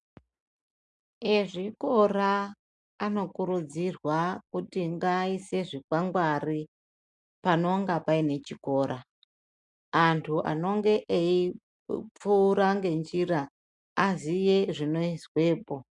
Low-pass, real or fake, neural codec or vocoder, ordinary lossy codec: 10.8 kHz; real; none; MP3, 96 kbps